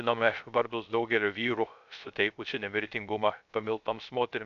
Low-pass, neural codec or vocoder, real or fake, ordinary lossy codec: 7.2 kHz; codec, 16 kHz, 0.3 kbps, FocalCodec; fake; MP3, 96 kbps